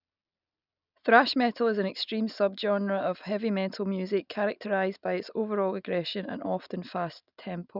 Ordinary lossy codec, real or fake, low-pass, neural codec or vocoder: none; real; 5.4 kHz; none